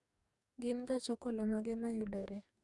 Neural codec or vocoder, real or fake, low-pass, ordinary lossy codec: codec, 44.1 kHz, 2.6 kbps, DAC; fake; 10.8 kHz; Opus, 64 kbps